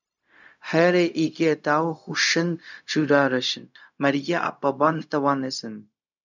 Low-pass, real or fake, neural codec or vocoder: 7.2 kHz; fake; codec, 16 kHz, 0.4 kbps, LongCat-Audio-Codec